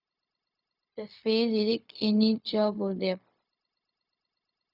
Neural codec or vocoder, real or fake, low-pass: codec, 16 kHz, 0.4 kbps, LongCat-Audio-Codec; fake; 5.4 kHz